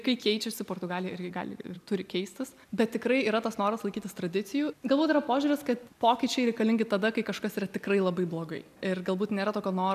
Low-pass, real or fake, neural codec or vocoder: 14.4 kHz; real; none